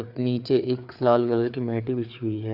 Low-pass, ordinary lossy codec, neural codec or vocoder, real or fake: 5.4 kHz; AAC, 48 kbps; codec, 16 kHz, 4 kbps, FreqCodec, larger model; fake